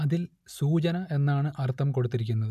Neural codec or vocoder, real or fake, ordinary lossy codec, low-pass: none; real; none; 14.4 kHz